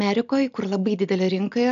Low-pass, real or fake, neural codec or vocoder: 7.2 kHz; real; none